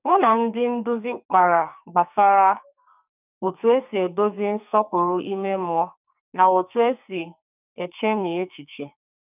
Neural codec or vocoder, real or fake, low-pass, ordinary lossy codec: codec, 44.1 kHz, 2.6 kbps, SNAC; fake; 3.6 kHz; none